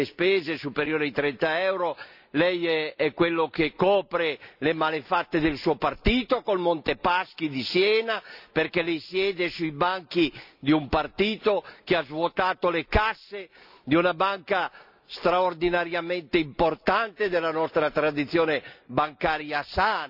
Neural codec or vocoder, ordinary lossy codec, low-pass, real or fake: none; none; 5.4 kHz; real